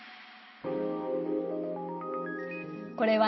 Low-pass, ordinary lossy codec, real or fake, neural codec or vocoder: 7.2 kHz; MP3, 24 kbps; real; none